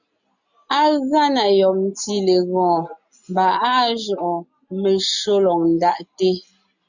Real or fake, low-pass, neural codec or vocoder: real; 7.2 kHz; none